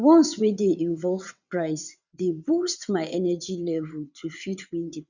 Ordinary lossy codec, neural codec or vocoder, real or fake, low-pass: none; vocoder, 22.05 kHz, 80 mel bands, WaveNeXt; fake; 7.2 kHz